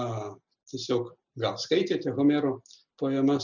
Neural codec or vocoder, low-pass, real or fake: none; 7.2 kHz; real